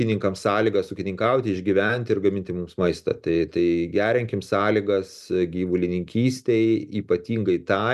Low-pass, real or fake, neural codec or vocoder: 14.4 kHz; real; none